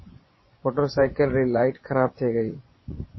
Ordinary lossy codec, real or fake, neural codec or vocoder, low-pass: MP3, 24 kbps; fake; vocoder, 24 kHz, 100 mel bands, Vocos; 7.2 kHz